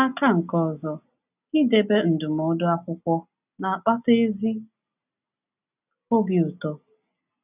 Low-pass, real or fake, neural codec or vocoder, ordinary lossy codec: 3.6 kHz; real; none; none